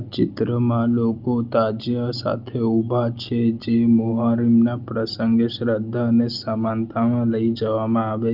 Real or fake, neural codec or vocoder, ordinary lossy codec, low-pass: real; none; Opus, 24 kbps; 5.4 kHz